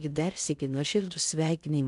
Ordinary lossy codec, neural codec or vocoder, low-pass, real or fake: MP3, 96 kbps; codec, 16 kHz in and 24 kHz out, 0.6 kbps, FocalCodec, streaming, 2048 codes; 10.8 kHz; fake